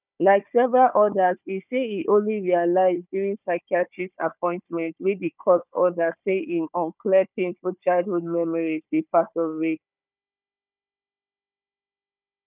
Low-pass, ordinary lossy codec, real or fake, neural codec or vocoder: 3.6 kHz; none; fake; codec, 16 kHz, 4 kbps, FunCodec, trained on Chinese and English, 50 frames a second